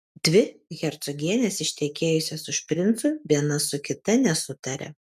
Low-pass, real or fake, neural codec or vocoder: 14.4 kHz; real; none